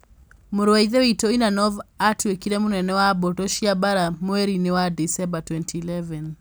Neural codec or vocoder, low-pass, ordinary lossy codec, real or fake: none; none; none; real